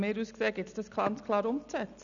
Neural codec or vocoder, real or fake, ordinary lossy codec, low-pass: none; real; none; 7.2 kHz